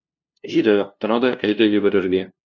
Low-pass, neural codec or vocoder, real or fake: 7.2 kHz; codec, 16 kHz, 0.5 kbps, FunCodec, trained on LibriTTS, 25 frames a second; fake